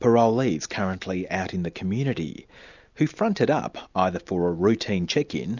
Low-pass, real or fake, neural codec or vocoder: 7.2 kHz; real; none